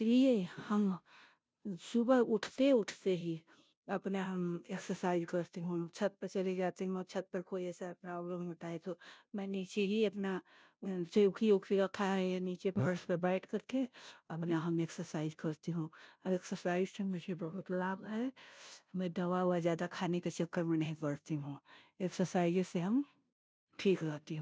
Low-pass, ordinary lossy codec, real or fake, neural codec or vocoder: none; none; fake; codec, 16 kHz, 0.5 kbps, FunCodec, trained on Chinese and English, 25 frames a second